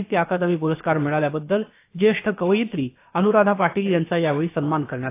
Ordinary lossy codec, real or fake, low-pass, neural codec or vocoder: AAC, 24 kbps; fake; 3.6 kHz; codec, 16 kHz, about 1 kbps, DyCAST, with the encoder's durations